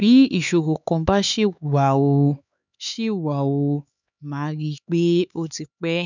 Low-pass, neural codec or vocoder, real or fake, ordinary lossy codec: 7.2 kHz; codec, 16 kHz, 4 kbps, X-Codec, HuBERT features, trained on LibriSpeech; fake; none